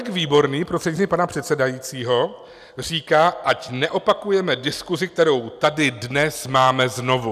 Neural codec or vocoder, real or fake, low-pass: none; real; 14.4 kHz